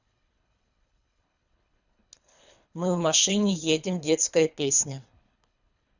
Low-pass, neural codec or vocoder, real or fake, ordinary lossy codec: 7.2 kHz; codec, 24 kHz, 3 kbps, HILCodec; fake; none